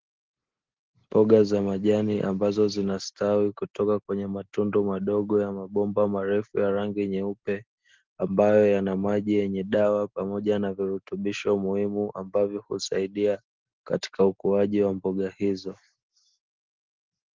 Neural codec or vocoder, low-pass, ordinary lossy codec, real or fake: none; 7.2 kHz; Opus, 16 kbps; real